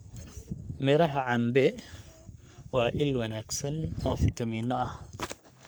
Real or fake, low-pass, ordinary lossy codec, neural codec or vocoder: fake; none; none; codec, 44.1 kHz, 3.4 kbps, Pupu-Codec